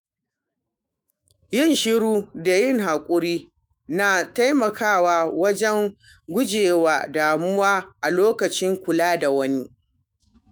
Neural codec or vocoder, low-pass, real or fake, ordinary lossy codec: autoencoder, 48 kHz, 128 numbers a frame, DAC-VAE, trained on Japanese speech; none; fake; none